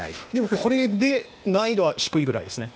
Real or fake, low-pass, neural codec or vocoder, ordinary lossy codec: fake; none; codec, 16 kHz, 0.8 kbps, ZipCodec; none